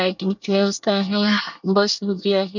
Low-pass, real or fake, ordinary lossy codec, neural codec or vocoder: 7.2 kHz; fake; none; codec, 24 kHz, 1 kbps, SNAC